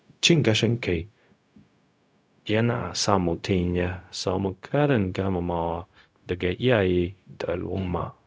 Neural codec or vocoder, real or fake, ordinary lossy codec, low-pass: codec, 16 kHz, 0.4 kbps, LongCat-Audio-Codec; fake; none; none